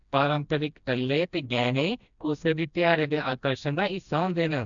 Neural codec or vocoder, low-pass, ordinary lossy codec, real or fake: codec, 16 kHz, 1 kbps, FreqCodec, smaller model; 7.2 kHz; none; fake